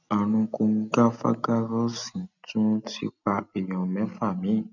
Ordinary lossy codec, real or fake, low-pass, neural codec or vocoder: none; real; 7.2 kHz; none